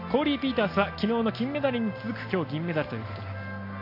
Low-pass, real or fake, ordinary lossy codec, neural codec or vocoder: 5.4 kHz; real; AAC, 32 kbps; none